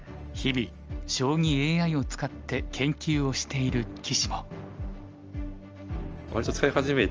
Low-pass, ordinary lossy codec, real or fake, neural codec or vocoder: 7.2 kHz; Opus, 24 kbps; real; none